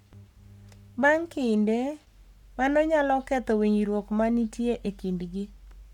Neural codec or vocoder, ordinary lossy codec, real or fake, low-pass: codec, 44.1 kHz, 7.8 kbps, Pupu-Codec; none; fake; 19.8 kHz